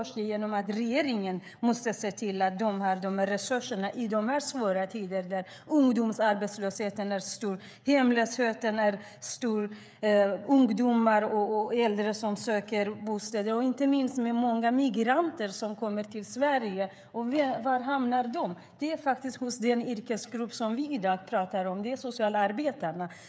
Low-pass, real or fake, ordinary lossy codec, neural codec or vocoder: none; fake; none; codec, 16 kHz, 16 kbps, FreqCodec, smaller model